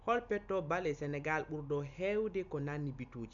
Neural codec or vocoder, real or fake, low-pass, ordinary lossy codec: none; real; 7.2 kHz; none